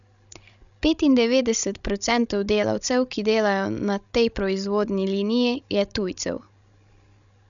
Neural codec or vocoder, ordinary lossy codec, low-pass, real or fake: codec, 16 kHz, 16 kbps, FreqCodec, larger model; none; 7.2 kHz; fake